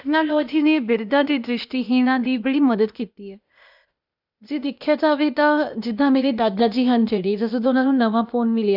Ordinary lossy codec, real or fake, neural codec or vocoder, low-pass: none; fake; codec, 16 kHz, 0.8 kbps, ZipCodec; 5.4 kHz